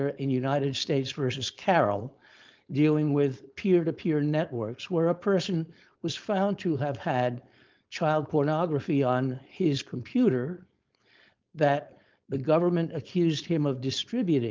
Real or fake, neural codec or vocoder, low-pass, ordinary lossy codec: fake; codec, 16 kHz, 4.8 kbps, FACodec; 7.2 kHz; Opus, 24 kbps